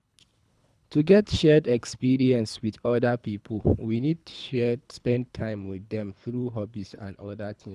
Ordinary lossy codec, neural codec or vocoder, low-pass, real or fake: none; codec, 24 kHz, 3 kbps, HILCodec; none; fake